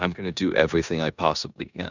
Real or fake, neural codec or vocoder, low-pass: fake; codec, 16 kHz in and 24 kHz out, 0.9 kbps, LongCat-Audio-Codec, four codebook decoder; 7.2 kHz